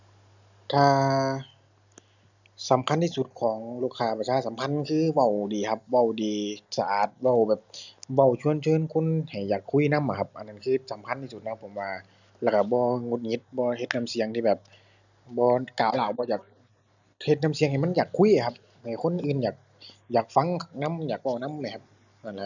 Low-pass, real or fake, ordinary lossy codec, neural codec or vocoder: 7.2 kHz; real; none; none